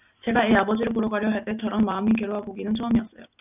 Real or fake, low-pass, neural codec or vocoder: real; 3.6 kHz; none